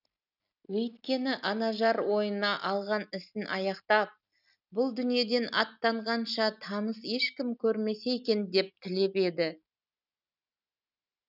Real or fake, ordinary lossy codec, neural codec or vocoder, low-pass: real; none; none; 5.4 kHz